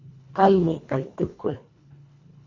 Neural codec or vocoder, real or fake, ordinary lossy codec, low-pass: codec, 24 kHz, 1.5 kbps, HILCodec; fake; Opus, 64 kbps; 7.2 kHz